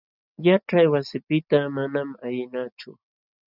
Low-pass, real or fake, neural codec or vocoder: 5.4 kHz; real; none